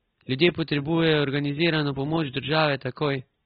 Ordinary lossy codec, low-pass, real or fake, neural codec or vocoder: AAC, 16 kbps; 7.2 kHz; real; none